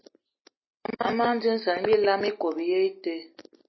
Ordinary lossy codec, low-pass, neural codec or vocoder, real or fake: MP3, 24 kbps; 7.2 kHz; none; real